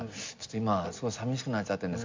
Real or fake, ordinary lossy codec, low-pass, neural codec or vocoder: real; MP3, 64 kbps; 7.2 kHz; none